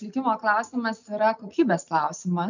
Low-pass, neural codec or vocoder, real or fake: 7.2 kHz; none; real